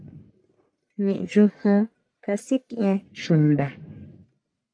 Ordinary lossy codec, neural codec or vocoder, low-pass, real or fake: AAC, 64 kbps; codec, 44.1 kHz, 1.7 kbps, Pupu-Codec; 9.9 kHz; fake